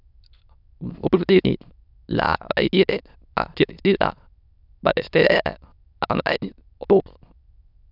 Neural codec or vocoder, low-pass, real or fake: autoencoder, 22.05 kHz, a latent of 192 numbers a frame, VITS, trained on many speakers; 5.4 kHz; fake